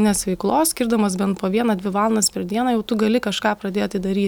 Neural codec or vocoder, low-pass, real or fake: none; 19.8 kHz; real